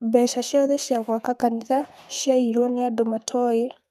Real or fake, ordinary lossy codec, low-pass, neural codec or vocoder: fake; none; 14.4 kHz; codec, 32 kHz, 1.9 kbps, SNAC